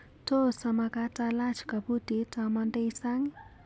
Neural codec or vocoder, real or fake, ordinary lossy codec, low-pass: none; real; none; none